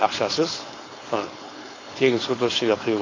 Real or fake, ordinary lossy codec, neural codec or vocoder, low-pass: fake; AAC, 32 kbps; codec, 16 kHz, 4.8 kbps, FACodec; 7.2 kHz